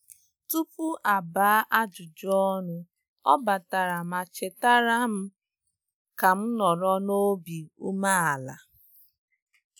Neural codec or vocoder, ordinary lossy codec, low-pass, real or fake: none; none; none; real